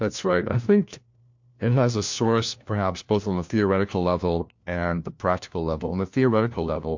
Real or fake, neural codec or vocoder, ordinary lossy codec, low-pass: fake; codec, 16 kHz, 1 kbps, FunCodec, trained on LibriTTS, 50 frames a second; MP3, 48 kbps; 7.2 kHz